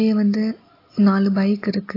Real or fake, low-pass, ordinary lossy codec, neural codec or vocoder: real; 5.4 kHz; AAC, 24 kbps; none